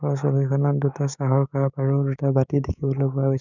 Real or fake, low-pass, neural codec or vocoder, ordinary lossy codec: fake; 7.2 kHz; codec, 16 kHz, 6 kbps, DAC; none